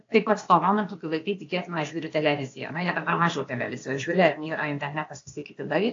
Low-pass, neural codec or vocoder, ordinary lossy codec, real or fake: 7.2 kHz; codec, 16 kHz, 0.8 kbps, ZipCodec; AAC, 48 kbps; fake